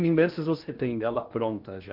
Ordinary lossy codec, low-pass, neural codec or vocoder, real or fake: Opus, 24 kbps; 5.4 kHz; codec, 16 kHz in and 24 kHz out, 0.8 kbps, FocalCodec, streaming, 65536 codes; fake